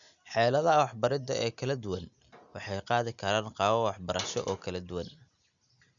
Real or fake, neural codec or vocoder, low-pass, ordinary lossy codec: real; none; 7.2 kHz; none